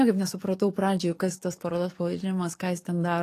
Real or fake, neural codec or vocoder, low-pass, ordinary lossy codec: fake; codec, 44.1 kHz, 7.8 kbps, DAC; 14.4 kHz; AAC, 48 kbps